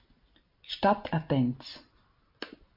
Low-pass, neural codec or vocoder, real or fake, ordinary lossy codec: 5.4 kHz; none; real; MP3, 32 kbps